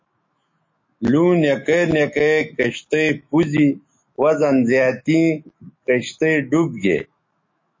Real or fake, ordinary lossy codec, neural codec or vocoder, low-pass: fake; MP3, 32 kbps; autoencoder, 48 kHz, 128 numbers a frame, DAC-VAE, trained on Japanese speech; 7.2 kHz